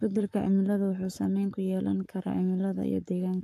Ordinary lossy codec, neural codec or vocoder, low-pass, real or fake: AAC, 96 kbps; codec, 44.1 kHz, 7.8 kbps, Pupu-Codec; 14.4 kHz; fake